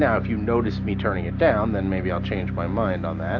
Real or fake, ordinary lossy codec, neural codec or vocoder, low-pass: real; AAC, 48 kbps; none; 7.2 kHz